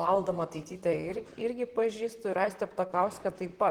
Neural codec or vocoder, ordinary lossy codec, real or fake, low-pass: vocoder, 44.1 kHz, 128 mel bands, Pupu-Vocoder; Opus, 16 kbps; fake; 19.8 kHz